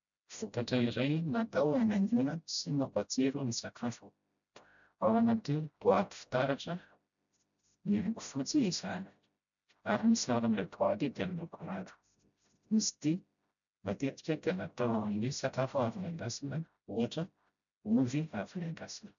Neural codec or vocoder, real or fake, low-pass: codec, 16 kHz, 0.5 kbps, FreqCodec, smaller model; fake; 7.2 kHz